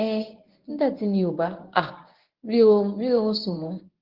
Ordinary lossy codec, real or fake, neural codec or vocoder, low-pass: Opus, 16 kbps; fake; codec, 24 kHz, 0.9 kbps, WavTokenizer, medium speech release version 1; 5.4 kHz